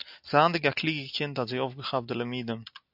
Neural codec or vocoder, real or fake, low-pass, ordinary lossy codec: none; real; 5.4 kHz; AAC, 48 kbps